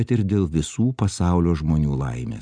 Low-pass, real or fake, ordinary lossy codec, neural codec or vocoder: 9.9 kHz; real; Opus, 64 kbps; none